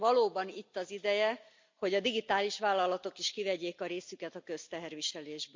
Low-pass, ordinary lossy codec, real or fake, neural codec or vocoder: 7.2 kHz; MP3, 64 kbps; real; none